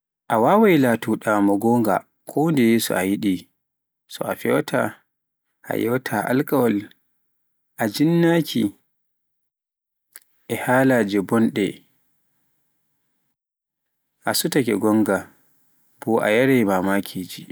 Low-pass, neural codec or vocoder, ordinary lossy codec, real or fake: none; none; none; real